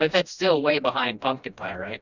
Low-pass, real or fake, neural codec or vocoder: 7.2 kHz; fake; codec, 16 kHz, 1 kbps, FreqCodec, smaller model